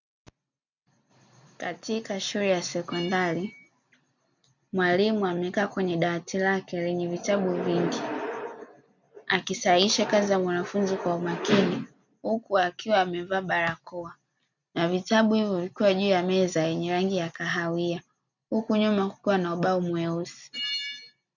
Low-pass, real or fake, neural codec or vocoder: 7.2 kHz; real; none